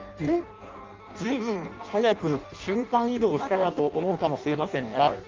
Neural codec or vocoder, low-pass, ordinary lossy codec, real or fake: codec, 16 kHz in and 24 kHz out, 0.6 kbps, FireRedTTS-2 codec; 7.2 kHz; Opus, 24 kbps; fake